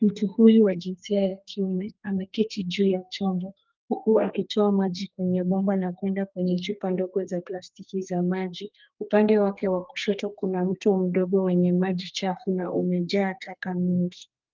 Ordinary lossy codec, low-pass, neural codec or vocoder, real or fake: Opus, 24 kbps; 7.2 kHz; codec, 32 kHz, 1.9 kbps, SNAC; fake